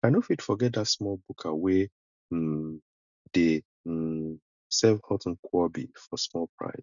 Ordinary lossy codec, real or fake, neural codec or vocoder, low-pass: none; real; none; 7.2 kHz